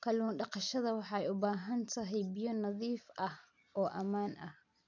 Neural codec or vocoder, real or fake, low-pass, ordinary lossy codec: none; real; 7.2 kHz; none